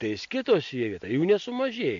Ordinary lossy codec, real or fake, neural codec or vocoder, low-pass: AAC, 48 kbps; real; none; 7.2 kHz